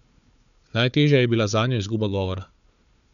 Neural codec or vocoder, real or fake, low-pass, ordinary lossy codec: codec, 16 kHz, 4 kbps, FunCodec, trained on Chinese and English, 50 frames a second; fake; 7.2 kHz; none